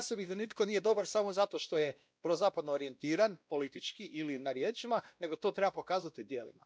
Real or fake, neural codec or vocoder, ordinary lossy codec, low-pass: fake; codec, 16 kHz, 1 kbps, X-Codec, WavLM features, trained on Multilingual LibriSpeech; none; none